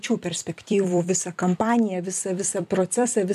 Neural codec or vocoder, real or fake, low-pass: vocoder, 44.1 kHz, 128 mel bands every 256 samples, BigVGAN v2; fake; 14.4 kHz